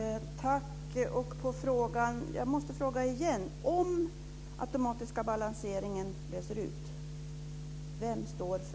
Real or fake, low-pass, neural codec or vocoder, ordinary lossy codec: real; none; none; none